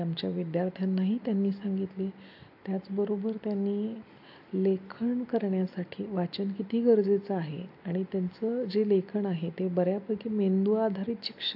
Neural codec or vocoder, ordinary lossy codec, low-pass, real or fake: none; AAC, 48 kbps; 5.4 kHz; real